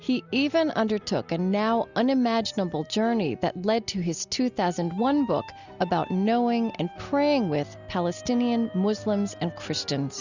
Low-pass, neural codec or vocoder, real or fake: 7.2 kHz; none; real